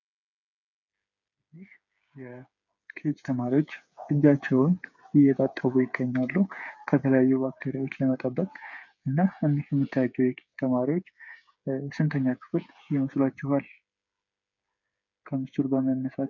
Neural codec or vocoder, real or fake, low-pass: codec, 16 kHz, 8 kbps, FreqCodec, smaller model; fake; 7.2 kHz